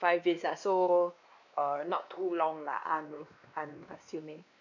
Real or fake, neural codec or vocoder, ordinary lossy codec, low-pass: fake; codec, 16 kHz, 2 kbps, X-Codec, WavLM features, trained on Multilingual LibriSpeech; none; 7.2 kHz